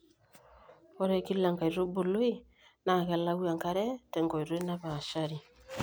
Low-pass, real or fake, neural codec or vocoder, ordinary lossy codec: none; real; none; none